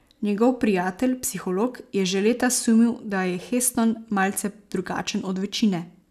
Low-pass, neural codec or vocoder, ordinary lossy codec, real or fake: 14.4 kHz; none; none; real